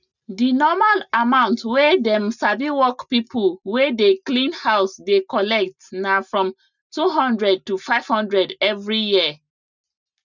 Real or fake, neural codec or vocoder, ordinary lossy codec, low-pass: real; none; none; 7.2 kHz